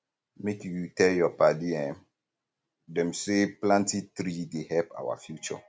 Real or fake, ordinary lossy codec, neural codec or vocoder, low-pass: real; none; none; none